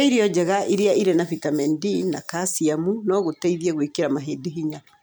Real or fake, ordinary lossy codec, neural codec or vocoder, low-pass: real; none; none; none